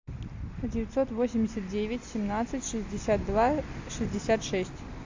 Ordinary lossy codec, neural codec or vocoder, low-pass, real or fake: AAC, 48 kbps; none; 7.2 kHz; real